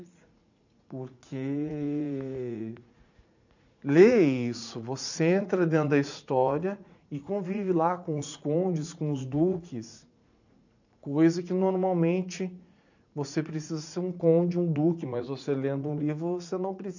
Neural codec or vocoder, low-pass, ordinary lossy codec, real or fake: vocoder, 44.1 kHz, 80 mel bands, Vocos; 7.2 kHz; none; fake